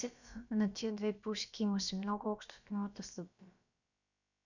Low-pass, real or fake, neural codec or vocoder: 7.2 kHz; fake; codec, 16 kHz, about 1 kbps, DyCAST, with the encoder's durations